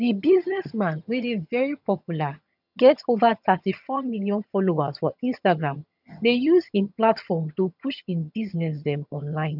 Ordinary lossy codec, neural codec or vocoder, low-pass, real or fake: none; vocoder, 22.05 kHz, 80 mel bands, HiFi-GAN; 5.4 kHz; fake